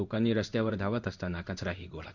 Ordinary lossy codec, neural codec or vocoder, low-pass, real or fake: none; codec, 16 kHz in and 24 kHz out, 1 kbps, XY-Tokenizer; 7.2 kHz; fake